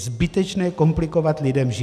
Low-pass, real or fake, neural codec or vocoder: 14.4 kHz; real; none